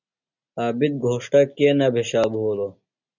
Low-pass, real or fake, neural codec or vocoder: 7.2 kHz; real; none